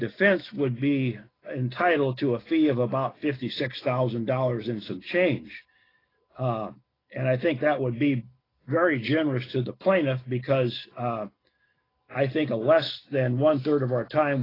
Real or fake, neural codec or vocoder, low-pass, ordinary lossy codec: real; none; 5.4 kHz; AAC, 24 kbps